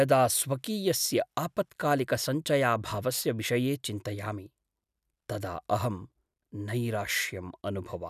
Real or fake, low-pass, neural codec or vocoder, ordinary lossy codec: real; 14.4 kHz; none; none